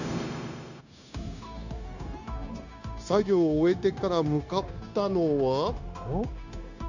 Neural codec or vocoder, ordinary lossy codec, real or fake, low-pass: codec, 16 kHz, 0.9 kbps, LongCat-Audio-Codec; none; fake; 7.2 kHz